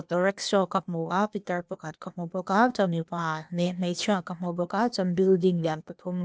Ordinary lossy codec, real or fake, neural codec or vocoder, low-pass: none; fake; codec, 16 kHz, 0.8 kbps, ZipCodec; none